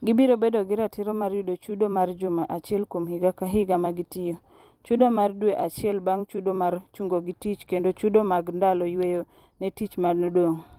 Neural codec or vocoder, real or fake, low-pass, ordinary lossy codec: vocoder, 44.1 kHz, 128 mel bands every 256 samples, BigVGAN v2; fake; 19.8 kHz; Opus, 24 kbps